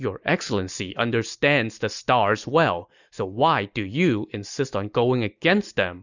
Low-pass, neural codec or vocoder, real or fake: 7.2 kHz; none; real